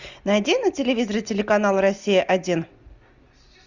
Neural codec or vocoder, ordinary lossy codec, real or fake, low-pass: none; Opus, 64 kbps; real; 7.2 kHz